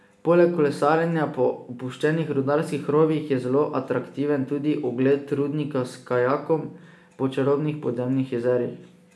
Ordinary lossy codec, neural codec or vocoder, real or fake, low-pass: none; none; real; none